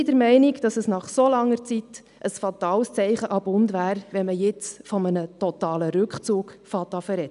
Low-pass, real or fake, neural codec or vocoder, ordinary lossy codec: 10.8 kHz; real; none; none